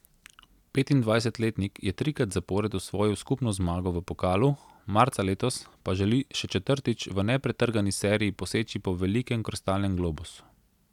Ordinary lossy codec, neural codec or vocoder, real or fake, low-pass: none; none; real; 19.8 kHz